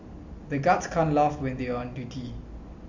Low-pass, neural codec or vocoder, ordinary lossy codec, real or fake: 7.2 kHz; none; Opus, 64 kbps; real